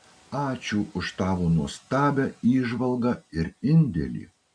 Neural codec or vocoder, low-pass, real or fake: none; 9.9 kHz; real